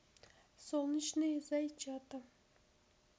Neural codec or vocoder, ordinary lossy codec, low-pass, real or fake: none; none; none; real